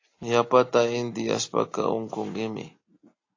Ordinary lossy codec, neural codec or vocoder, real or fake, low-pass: AAC, 32 kbps; none; real; 7.2 kHz